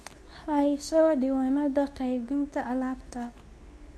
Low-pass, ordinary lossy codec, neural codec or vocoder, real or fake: none; none; codec, 24 kHz, 0.9 kbps, WavTokenizer, medium speech release version 2; fake